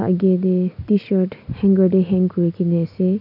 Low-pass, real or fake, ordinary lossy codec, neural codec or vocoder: 5.4 kHz; real; none; none